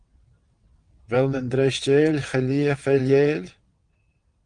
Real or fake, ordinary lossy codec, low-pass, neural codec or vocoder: fake; Opus, 24 kbps; 9.9 kHz; vocoder, 22.05 kHz, 80 mel bands, WaveNeXt